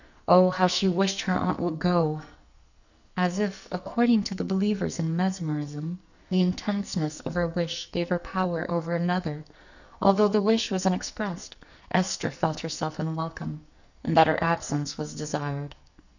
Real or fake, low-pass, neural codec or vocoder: fake; 7.2 kHz; codec, 44.1 kHz, 2.6 kbps, SNAC